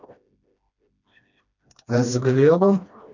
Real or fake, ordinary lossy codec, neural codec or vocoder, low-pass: fake; none; codec, 16 kHz, 1 kbps, FreqCodec, smaller model; 7.2 kHz